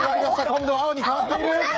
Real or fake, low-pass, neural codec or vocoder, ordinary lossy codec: fake; none; codec, 16 kHz, 8 kbps, FreqCodec, smaller model; none